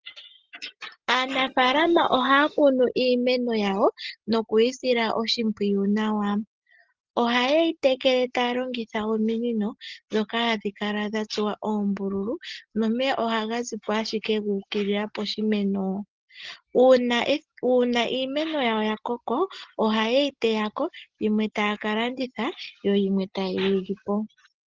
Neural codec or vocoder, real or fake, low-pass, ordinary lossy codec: none; real; 7.2 kHz; Opus, 16 kbps